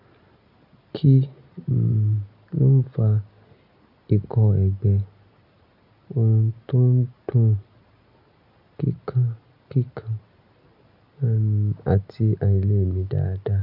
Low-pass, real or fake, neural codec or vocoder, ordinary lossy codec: 5.4 kHz; real; none; none